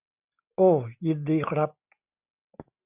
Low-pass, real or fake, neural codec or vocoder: 3.6 kHz; real; none